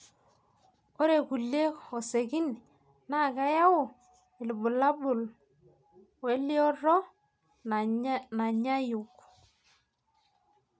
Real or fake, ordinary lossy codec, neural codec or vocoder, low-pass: real; none; none; none